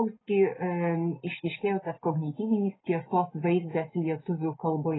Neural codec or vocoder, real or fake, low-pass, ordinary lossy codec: none; real; 7.2 kHz; AAC, 16 kbps